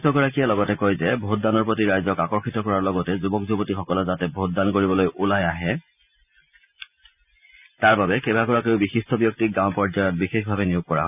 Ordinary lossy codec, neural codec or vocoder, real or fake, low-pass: none; none; real; 3.6 kHz